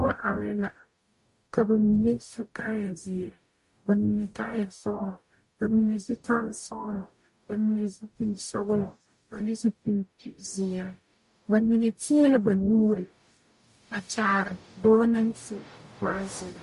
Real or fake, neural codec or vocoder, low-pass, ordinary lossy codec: fake; codec, 44.1 kHz, 0.9 kbps, DAC; 14.4 kHz; MP3, 48 kbps